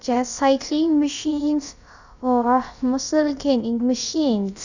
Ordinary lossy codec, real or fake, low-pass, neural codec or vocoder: none; fake; 7.2 kHz; codec, 16 kHz, about 1 kbps, DyCAST, with the encoder's durations